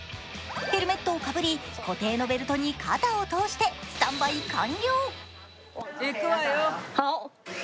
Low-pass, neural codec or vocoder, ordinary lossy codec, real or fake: none; none; none; real